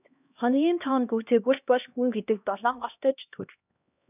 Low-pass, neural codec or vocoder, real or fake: 3.6 kHz; codec, 16 kHz, 1 kbps, X-Codec, HuBERT features, trained on LibriSpeech; fake